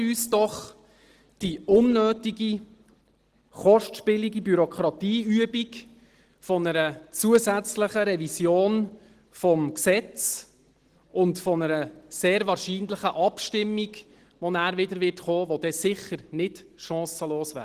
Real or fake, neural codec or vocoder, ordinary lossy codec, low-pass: real; none; Opus, 16 kbps; 14.4 kHz